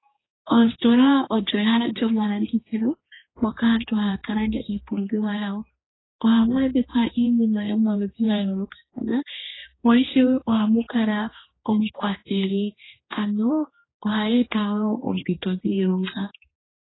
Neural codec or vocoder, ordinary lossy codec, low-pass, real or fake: codec, 16 kHz, 2 kbps, X-Codec, HuBERT features, trained on general audio; AAC, 16 kbps; 7.2 kHz; fake